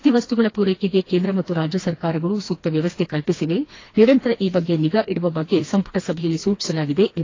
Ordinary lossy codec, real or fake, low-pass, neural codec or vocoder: AAC, 32 kbps; fake; 7.2 kHz; codec, 16 kHz, 2 kbps, FreqCodec, smaller model